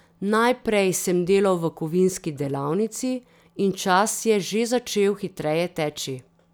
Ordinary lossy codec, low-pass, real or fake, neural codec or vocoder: none; none; real; none